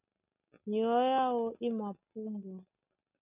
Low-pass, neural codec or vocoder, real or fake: 3.6 kHz; none; real